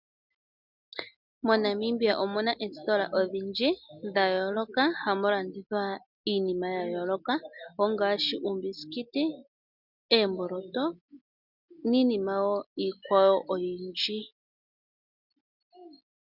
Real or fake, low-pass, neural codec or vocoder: real; 5.4 kHz; none